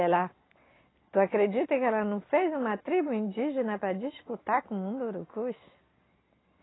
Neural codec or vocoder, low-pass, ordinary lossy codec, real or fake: none; 7.2 kHz; AAC, 16 kbps; real